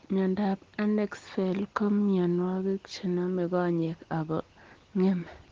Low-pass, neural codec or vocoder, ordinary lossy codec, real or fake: 7.2 kHz; none; Opus, 16 kbps; real